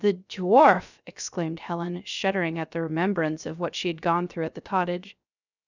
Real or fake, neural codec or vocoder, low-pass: fake; codec, 16 kHz, 0.3 kbps, FocalCodec; 7.2 kHz